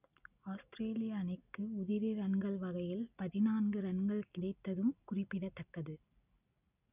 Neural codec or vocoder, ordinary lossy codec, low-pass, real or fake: none; none; 3.6 kHz; real